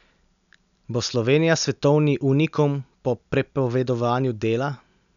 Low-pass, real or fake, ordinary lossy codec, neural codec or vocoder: 7.2 kHz; real; none; none